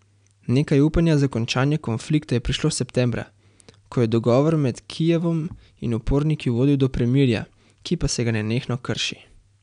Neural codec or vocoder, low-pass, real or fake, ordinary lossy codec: none; 9.9 kHz; real; none